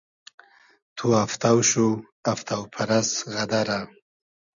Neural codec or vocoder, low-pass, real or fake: none; 7.2 kHz; real